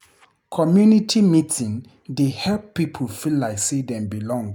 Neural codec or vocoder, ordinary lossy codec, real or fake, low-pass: none; none; real; 19.8 kHz